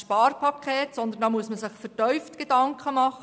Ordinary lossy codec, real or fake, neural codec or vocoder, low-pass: none; real; none; none